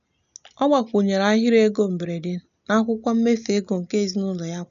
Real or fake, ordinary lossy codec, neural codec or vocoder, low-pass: real; none; none; 7.2 kHz